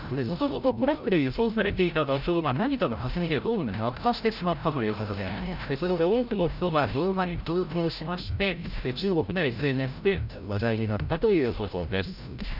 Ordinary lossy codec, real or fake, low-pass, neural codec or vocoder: none; fake; 5.4 kHz; codec, 16 kHz, 0.5 kbps, FreqCodec, larger model